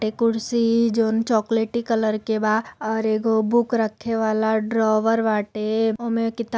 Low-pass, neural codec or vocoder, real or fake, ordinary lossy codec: none; none; real; none